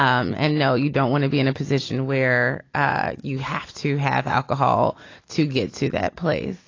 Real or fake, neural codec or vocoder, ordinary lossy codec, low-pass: real; none; AAC, 32 kbps; 7.2 kHz